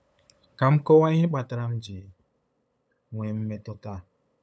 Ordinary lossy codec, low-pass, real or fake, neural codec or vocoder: none; none; fake; codec, 16 kHz, 8 kbps, FunCodec, trained on LibriTTS, 25 frames a second